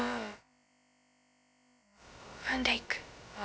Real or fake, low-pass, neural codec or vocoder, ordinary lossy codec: fake; none; codec, 16 kHz, about 1 kbps, DyCAST, with the encoder's durations; none